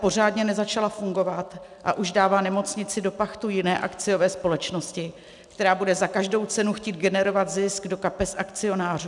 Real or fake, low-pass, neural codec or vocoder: real; 10.8 kHz; none